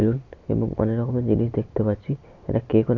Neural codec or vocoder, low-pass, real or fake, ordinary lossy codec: none; 7.2 kHz; real; none